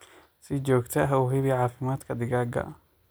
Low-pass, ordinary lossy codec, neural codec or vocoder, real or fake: none; none; none; real